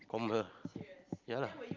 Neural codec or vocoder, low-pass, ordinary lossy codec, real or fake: none; 7.2 kHz; Opus, 32 kbps; real